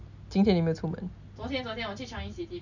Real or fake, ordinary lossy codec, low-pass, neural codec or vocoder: real; none; 7.2 kHz; none